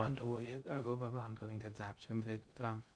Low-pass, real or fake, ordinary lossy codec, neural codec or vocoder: 9.9 kHz; fake; none; codec, 16 kHz in and 24 kHz out, 0.6 kbps, FocalCodec, streaming, 2048 codes